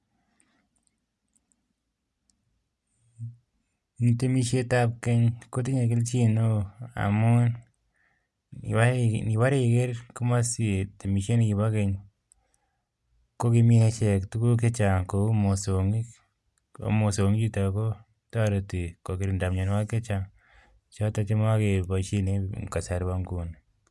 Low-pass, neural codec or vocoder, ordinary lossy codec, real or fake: none; none; none; real